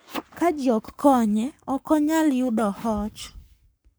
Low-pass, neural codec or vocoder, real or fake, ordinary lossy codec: none; codec, 44.1 kHz, 7.8 kbps, Pupu-Codec; fake; none